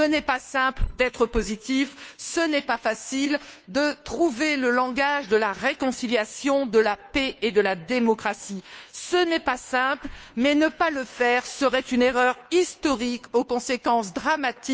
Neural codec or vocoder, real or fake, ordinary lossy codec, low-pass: codec, 16 kHz, 2 kbps, FunCodec, trained on Chinese and English, 25 frames a second; fake; none; none